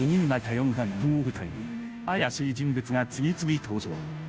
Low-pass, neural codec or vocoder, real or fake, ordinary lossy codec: none; codec, 16 kHz, 0.5 kbps, FunCodec, trained on Chinese and English, 25 frames a second; fake; none